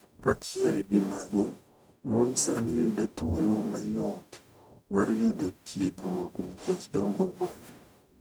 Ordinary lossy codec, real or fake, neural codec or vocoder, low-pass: none; fake; codec, 44.1 kHz, 0.9 kbps, DAC; none